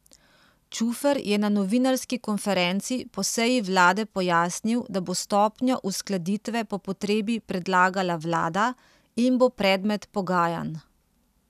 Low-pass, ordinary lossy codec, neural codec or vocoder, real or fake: 14.4 kHz; none; none; real